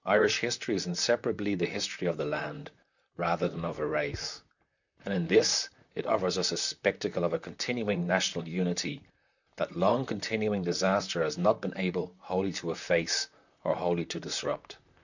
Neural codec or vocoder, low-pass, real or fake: vocoder, 44.1 kHz, 128 mel bands, Pupu-Vocoder; 7.2 kHz; fake